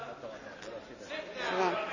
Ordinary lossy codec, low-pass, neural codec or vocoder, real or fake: none; 7.2 kHz; none; real